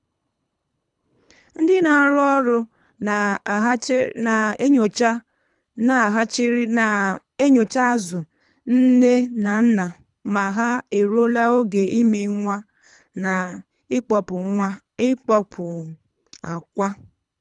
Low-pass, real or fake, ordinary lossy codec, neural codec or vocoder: 10.8 kHz; fake; none; codec, 24 kHz, 3 kbps, HILCodec